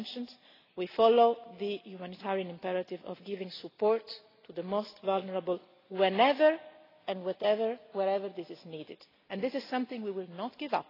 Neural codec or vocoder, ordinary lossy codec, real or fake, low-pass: none; AAC, 24 kbps; real; 5.4 kHz